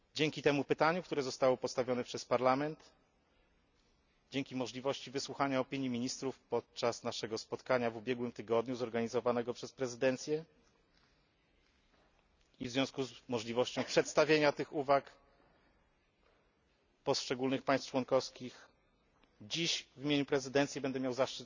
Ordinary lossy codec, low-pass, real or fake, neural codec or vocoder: none; 7.2 kHz; real; none